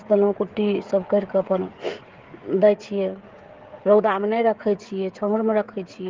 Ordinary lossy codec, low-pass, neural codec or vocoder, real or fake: Opus, 16 kbps; 7.2 kHz; codec, 16 kHz, 16 kbps, FreqCodec, smaller model; fake